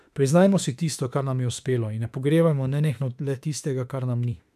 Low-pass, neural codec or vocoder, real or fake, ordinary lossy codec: 14.4 kHz; autoencoder, 48 kHz, 32 numbers a frame, DAC-VAE, trained on Japanese speech; fake; none